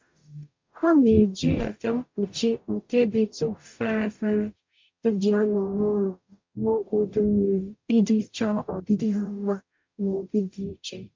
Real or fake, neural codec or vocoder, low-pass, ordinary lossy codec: fake; codec, 44.1 kHz, 0.9 kbps, DAC; 7.2 kHz; MP3, 48 kbps